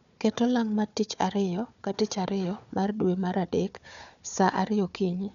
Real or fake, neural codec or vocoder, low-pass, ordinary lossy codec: fake; codec, 16 kHz, 4 kbps, FunCodec, trained on Chinese and English, 50 frames a second; 7.2 kHz; none